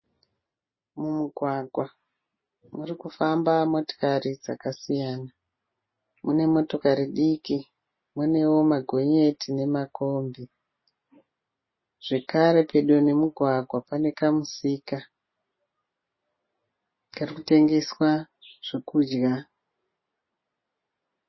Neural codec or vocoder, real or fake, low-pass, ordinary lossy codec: none; real; 7.2 kHz; MP3, 24 kbps